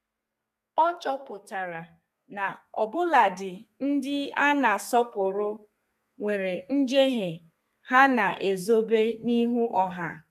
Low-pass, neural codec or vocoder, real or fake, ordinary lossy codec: 14.4 kHz; codec, 32 kHz, 1.9 kbps, SNAC; fake; none